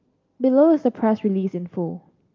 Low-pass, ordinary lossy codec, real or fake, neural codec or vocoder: 7.2 kHz; Opus, 24 kbps; real; none